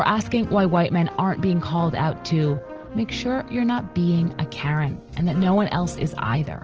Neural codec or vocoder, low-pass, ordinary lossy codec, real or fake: none; 7.2 kHz; Opus, 16 kbps; real